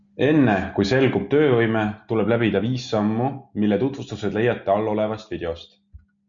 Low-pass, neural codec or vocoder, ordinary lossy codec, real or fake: 7.2 kHz; none; MP3, 64 kbps; real